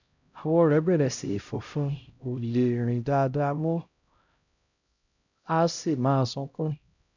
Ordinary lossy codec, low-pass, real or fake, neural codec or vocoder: none; 7.2 kHz; fake; codec, 16 kHz, 0.5 kbps, X-Codec, HuBERT features, trained on LibriSpeech